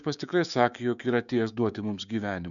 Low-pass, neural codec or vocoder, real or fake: 7.2 kHz; codec, 16 kHz, 6 kbps, DAC; fake